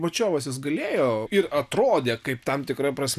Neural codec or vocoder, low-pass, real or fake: none; 14.4 kHz; real